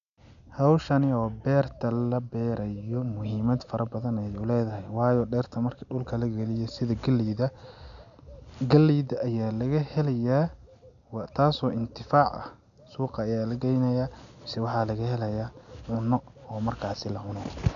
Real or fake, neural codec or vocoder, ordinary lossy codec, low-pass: real; none; none; 7.2 kHz